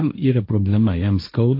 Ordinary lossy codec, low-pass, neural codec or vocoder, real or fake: AAC, 32 kbps; 5.4 kHz; codec, 16 kHz, 1.1 kbps, Voila-Tokenizer; fake